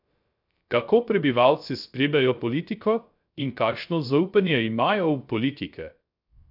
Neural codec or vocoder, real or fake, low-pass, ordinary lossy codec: codec, 16 kHz, 0.3 kbps, FocalCodec; fake; 5.4 kHz; none